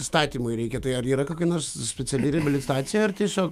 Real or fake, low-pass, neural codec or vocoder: fake; 14.4 kHz; autoencoder, 48 kHz, 128 numbers a frame, DAC-VAE, trained on Japanese speech